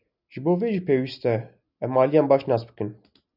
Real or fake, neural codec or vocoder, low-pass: real; none; 5.4 kHz